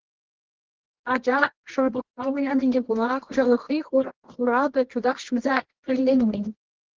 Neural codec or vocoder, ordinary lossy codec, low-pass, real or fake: codec, 24 kHz, 0.9 kbps, WavTokenizer, medium music audio release; Opus, 16 kbps; 7.2 kHz; fake